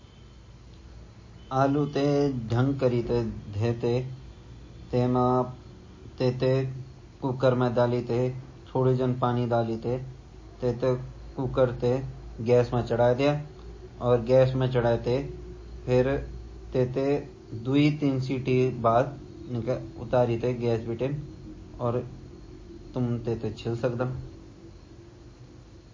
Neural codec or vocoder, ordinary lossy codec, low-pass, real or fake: none; MP3, 32 kbps; 7.2 kHz; real